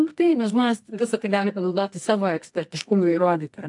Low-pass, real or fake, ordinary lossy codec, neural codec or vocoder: 10.8 kHz; fake; AAC, 64 kbps; codec, 24 kHz, 0.9 kbps, WavTokenizer, medium music audio release